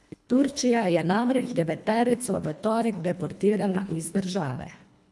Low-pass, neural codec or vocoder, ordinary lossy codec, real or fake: none; codec, 24 kHz, 1.5 kbps, HILCodec; none; fake